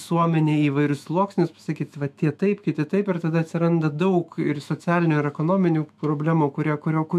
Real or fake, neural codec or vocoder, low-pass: fake; autoencoder, 48 kHz, 128 numbers a frame, DAC-VAE, trained on Japanese speech; 14.4 kHz